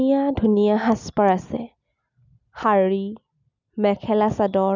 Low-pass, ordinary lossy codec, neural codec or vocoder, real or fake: 7.2 kHz; none; none; real